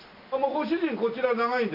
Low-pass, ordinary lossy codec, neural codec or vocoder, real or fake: 5.4 kHz; none; none; real